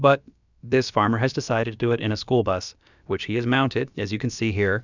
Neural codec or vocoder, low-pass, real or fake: codec, 16 kHz, about 1 kbps, DyCAST, with the encoder's durations; 7.2 kHz; fake